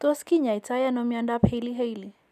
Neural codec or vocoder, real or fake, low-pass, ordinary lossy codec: none; real; 14.4 kHz; none